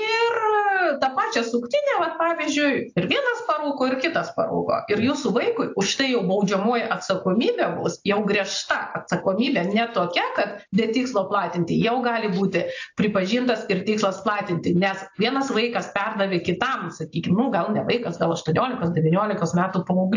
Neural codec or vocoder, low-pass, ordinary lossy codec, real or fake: none; 7.2 kHz; AAC, 48 kbps; real